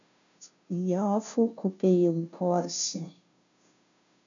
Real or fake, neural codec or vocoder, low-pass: fake; codec, 16 kHz, 0.5 kbps, FunCodec, trained on Chinese and English, 25 frames a second; 7.2 kHz